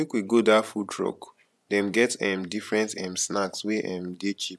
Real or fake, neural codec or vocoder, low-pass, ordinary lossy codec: fake; vocoder, 24 kHz, 100 mel bands, Vocos; none; none